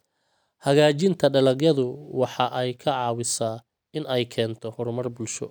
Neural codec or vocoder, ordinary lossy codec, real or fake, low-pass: none; none; real; none